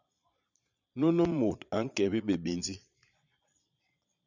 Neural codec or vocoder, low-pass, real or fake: vocoder, 22.05 kHz, 80 mel bands, Vocos; 7.2 kHz; fake